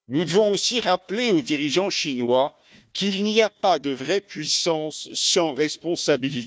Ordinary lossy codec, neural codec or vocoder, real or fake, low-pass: none; codec, 16 kHz, 1 kbps, FunCodec, trained on Chinese and English, 50 frames a second; fake; none